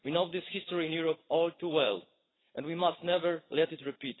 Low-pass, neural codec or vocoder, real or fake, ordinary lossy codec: 7.2 kHz; vocoder, 22.05 kHz, 80 mel bands, WaveNeXt; fake; AAC, 16 kbps